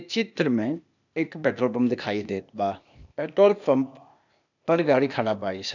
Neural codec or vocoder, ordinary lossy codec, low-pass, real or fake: codec, 16 kHz, 0.8 kbps, ZipCodec; none; 7.2 kHz; fake